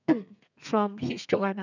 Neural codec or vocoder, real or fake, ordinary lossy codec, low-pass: codec, 32 kHz, 1.9 kbps, SNAC; fake; none; 7.2 kHz